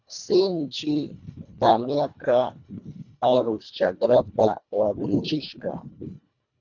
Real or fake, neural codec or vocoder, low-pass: fake; codec, 24 kHz, 1.5 kbps, HILCodec; 7.2 kHz